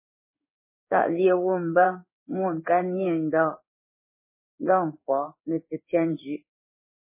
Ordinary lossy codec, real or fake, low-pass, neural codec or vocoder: MP3, 16 kbps; fake; 3.6 kHz; codec, 16 kHz in and 24 kHz out, 1 kbps, XY-Tokenizer